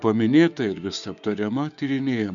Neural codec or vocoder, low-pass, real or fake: codec, 16 kHz, 6 kbps, DAC; 7.2 kHz; fake